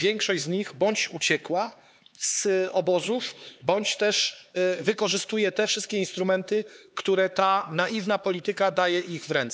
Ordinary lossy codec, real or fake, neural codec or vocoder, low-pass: none; fake; codec, 16 kHz, 4 kbps, X-Codec, HuBERT features, trained on LibriSpeech; none